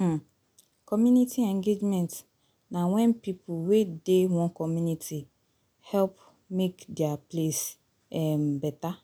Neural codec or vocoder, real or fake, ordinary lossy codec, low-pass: none; real; none; 19.8 kHz